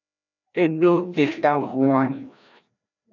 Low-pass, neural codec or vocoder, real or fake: 7.2 kHz; codec, 16 kHz, 1 kbps, FreqCodec, larger model; fake